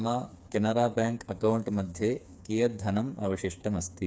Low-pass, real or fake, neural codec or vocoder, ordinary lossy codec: none; fake; codec, 16 kHz, 4 kbps, FreqCodec, smaller model; none